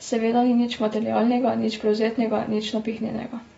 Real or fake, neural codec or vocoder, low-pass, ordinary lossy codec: real; none; 19.8 kHz; AAC, 24 kbps